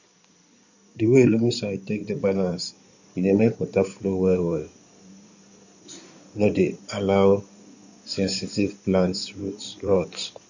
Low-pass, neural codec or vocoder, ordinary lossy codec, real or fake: 7.2 kHz; codec, 16 kHz in and 24 kHz out, 2.2 kbps, FireRedTTS-2 codec; none; fake